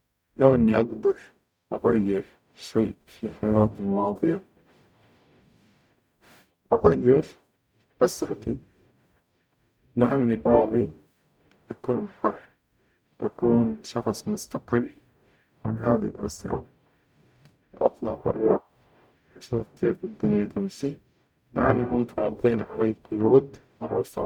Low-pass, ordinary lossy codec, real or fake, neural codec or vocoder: 19.8 kHz; none; fake; codec, 44.1 kHz, 0.9 kbps, DAC